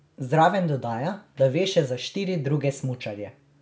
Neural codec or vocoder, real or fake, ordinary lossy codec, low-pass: none; real; none; none